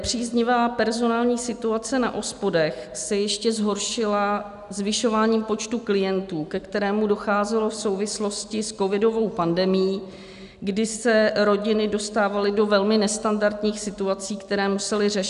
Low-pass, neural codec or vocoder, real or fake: 10.8 kHz; none; real